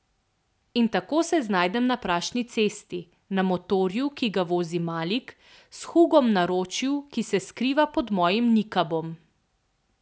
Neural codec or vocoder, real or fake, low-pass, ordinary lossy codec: none; real; none; none